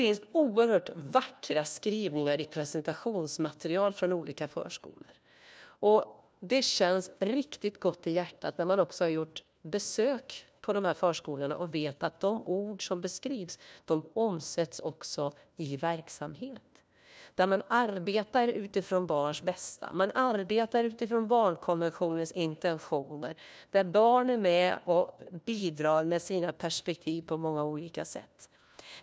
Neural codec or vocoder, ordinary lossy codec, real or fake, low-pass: codec, 16 kHz, 1 kbps, FunCodec, trained on LibriTTS, 50 frames a second; none; fake; none